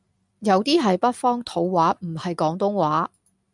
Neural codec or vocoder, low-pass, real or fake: none; 10.8 kHz; real